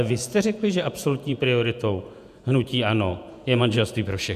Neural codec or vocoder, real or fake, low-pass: vocoder, 48 kHz, 128 mel bands, Vocos; fake; 14.4 kHz